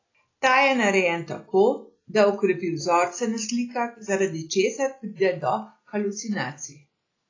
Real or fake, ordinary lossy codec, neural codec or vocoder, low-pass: real; AAC, 32 kbps; none; 7.2 kHz